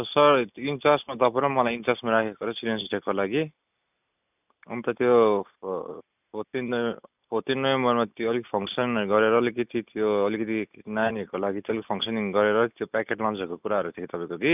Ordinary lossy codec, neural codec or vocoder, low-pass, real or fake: none; none; 3.6 kHz; real